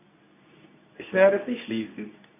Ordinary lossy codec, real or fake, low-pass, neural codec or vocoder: none; fake; 3.6 kHz; codec, 24 kHz, 0.9 kbps, WavTokenizer, medium speech release version 2